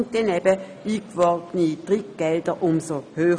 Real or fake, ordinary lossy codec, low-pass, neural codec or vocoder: real; none; 9.9 kHz; none